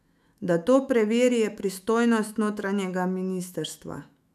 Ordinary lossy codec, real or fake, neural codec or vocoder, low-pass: none; fake; autoencoder, 48 kHz, 128 numbers a frame, DAC-VAE, trained on Japanese speech; 14.4 kHz